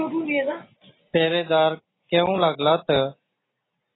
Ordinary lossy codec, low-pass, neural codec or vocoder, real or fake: AAC, 16 kbps; 7.2 kHz; none; real